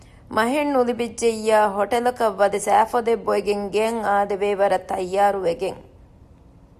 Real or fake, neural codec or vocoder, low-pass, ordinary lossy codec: real; none; 14.4 kHz; Opus, 64 kbps